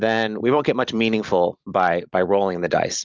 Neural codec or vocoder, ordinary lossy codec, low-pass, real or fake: none; Opus, 32 kbps; 7.2 kHz; real